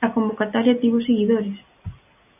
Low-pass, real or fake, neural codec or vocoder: 3.6 kHz; real; none